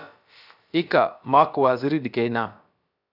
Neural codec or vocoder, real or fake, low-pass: codec, 16 kHz, about 1 kbps, DyCAST, with the encoder's durations; fake; 5.4 kHz